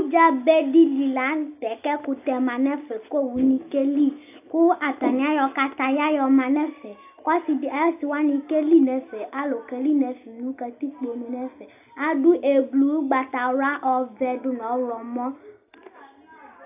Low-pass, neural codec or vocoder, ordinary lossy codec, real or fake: 3.6 kHz; none; AAC, 32 kbps; real